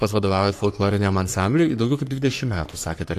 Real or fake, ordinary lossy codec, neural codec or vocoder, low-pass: fake; AAC, 64 kbps; codec, 44.1 kHz, 3.4 kbps, Pupu-Codec; 14.4 kHz